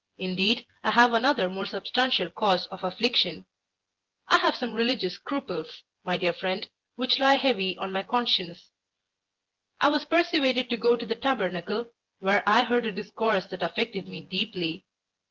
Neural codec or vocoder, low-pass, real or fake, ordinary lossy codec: vocoder, 24 kHz, 100 mel bands, Vocos; 7.2 kHz; fake; Opus, 16 kbps